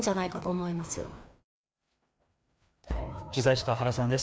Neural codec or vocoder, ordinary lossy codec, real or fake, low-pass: codec, 16 kHz, 2 kbps, FreqCodec, larger model; none; fake; none